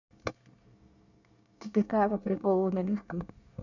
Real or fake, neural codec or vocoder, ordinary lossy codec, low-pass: fake; codec, 24 kHz, 1 kbps, SNAC; none; 7.2 kHz